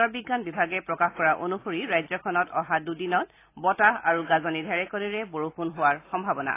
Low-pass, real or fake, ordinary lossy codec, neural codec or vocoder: 3.6 kHz; real; AAC, 24 kbps; none